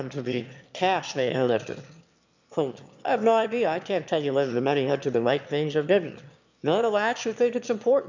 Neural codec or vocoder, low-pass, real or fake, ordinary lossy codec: autoencoder, 22.05 kHz, a latent of 192 numbers a frame, VITS, trained on one speaker; 7.2 kHz; fake; MP3, 64 kbps